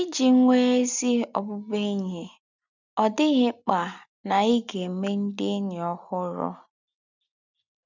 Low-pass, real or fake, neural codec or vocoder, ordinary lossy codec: 7.2 kHz; real; none; none